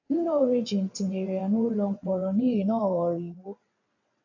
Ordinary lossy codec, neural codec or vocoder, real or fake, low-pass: AAC, 48 kbps; vocoder, 22.05 kHz, 80 mel bands, WaveNeXt; fake; 7.2 kHz